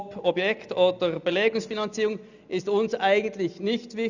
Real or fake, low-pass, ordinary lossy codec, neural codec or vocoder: real; 7.2 kHz; none; none